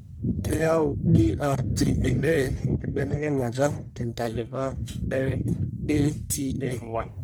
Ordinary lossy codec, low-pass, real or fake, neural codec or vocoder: none; none; fake; codec, 44.1 kHz, 1.7 kbps, Pupu-Codec